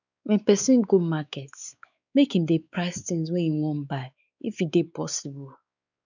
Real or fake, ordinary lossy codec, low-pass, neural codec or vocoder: fake; none; 7.2 kHz; codec, 16 kHz, 4 kbps, X-Codec, WavLM features, trained on Multilingual LibriSpeech